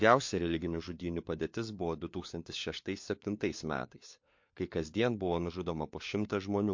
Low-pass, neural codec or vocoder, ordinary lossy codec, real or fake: 7.2 kHz; codec, 16 kHz, 4 kbps, FunCodec, trained on LibriTTS, 50 frames a second; MP3, 48 kbps; fake